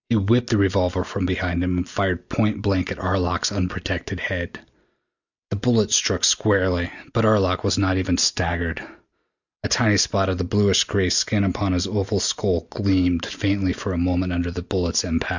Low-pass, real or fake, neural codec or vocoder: 7.2 kHz; real; none